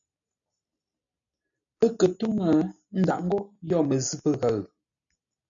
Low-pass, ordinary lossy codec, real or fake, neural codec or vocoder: 7.2 kHz; AAC, 64 kbps; real; none